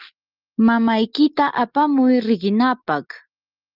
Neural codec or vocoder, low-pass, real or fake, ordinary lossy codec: none; 5.4 kHz; real; Opus, 32 kbps